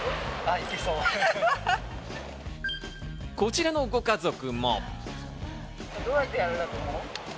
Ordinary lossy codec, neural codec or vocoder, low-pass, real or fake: none; none; none; real